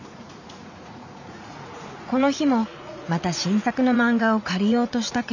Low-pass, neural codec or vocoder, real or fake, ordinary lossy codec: 7.2 kHz; vocoder, 44.1 kHz, 80 mel bands, Vocos; fake; none